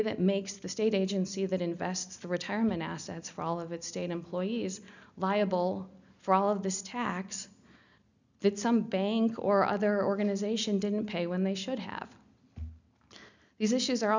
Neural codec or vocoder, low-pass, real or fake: none; 7.2 kHz; real